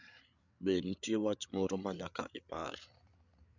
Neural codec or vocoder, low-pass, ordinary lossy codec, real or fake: codec, 16 kHz, 8 kbps, FreqCodec, larger model; 7.2 kHz; none; fake